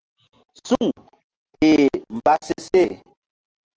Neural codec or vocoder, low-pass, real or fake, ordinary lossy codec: none; 7.2 kHz; real; Opus, 16 kbps